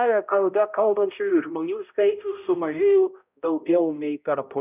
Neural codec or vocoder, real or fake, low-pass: codec, 16 kHz, 0.5 kbps, X-Codec, HuBERT features, trained on balanced general audio; fake; 3.6 kHz